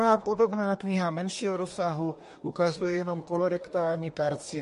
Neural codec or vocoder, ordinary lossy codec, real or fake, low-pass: codec, 24 kHz, 1 kbps, SNAC; MP3, 48 kbps; fake; 10.8 kHz